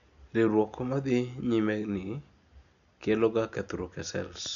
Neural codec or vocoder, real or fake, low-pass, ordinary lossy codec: none; real; 7.2 kHz; none